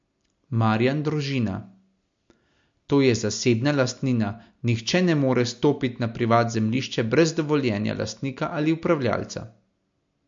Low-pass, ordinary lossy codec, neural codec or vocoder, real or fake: 7.2 kHz; MP3, 48 kbps; none; real